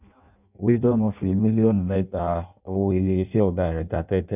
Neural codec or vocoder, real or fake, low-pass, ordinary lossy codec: codec, 16 kHz in and 24 kHz out, 0.6 kbps, FireRedTTS-2 codec; fake; 3.6 kHz; none